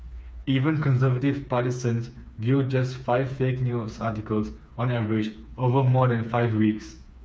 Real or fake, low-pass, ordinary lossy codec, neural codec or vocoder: fake; none; none; codec, 16 kHz, 4 kbps, FreqCodec, smaller model